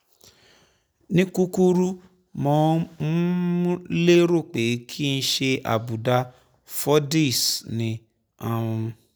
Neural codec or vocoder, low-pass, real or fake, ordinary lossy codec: none; none; real; none